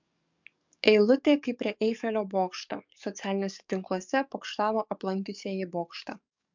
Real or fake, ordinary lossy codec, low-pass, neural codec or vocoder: fake; MP3, 64 kbps; 7.2 kHz; codec, 44.1 kHz, 7.8 kbps, DAC